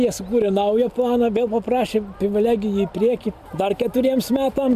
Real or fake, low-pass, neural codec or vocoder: real; 14.4 kHz; none